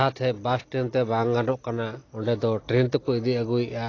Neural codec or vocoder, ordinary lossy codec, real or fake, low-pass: none; AAC, 32 kbps; real; 7.2 kHz